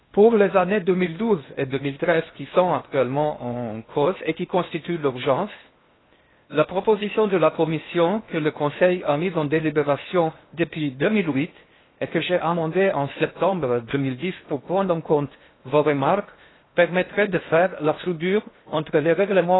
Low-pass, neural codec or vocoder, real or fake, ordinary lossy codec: 7.2 kHz; codec, 16 kHz in and 24 kHz out, 0.8 kbps, FocalCodec, streaming, 65536 codes; fake; AAC, 16 kbps